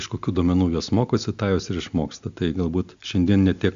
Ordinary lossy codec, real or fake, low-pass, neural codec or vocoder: AAC, 64 kbps; real; 7.2 kHz; none